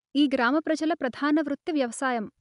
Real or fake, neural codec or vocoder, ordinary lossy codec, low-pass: real; none; none; 10.8 kHz